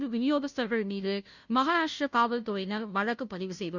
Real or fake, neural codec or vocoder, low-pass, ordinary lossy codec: fake; codec, 16 kHz, 0.5 kbps, FunCodec, trained on Chinese and English, 25 frames a second; 7.2 kHz; none